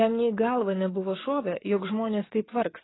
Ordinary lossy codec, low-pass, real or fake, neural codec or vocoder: AAC, 16 kbps; 7.2 kHz; fake; codec, 44.1 kHz, 7.8 kbps, DAC